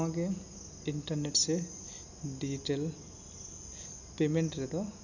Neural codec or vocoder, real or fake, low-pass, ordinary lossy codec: none; real; 7.2 kHz; none